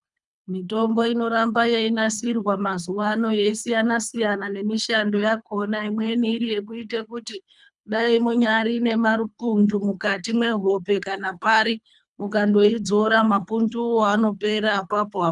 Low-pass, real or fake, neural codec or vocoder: 10.8 kHz; fake; codec, 24 kHz, 3 kbps, HILCodec